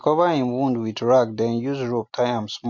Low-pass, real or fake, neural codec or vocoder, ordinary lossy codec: 7.2 kHz; real; none; MP3, 48 kbps